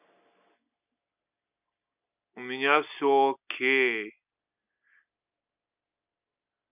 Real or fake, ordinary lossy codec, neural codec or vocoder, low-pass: real; none; none; 3.6 kHz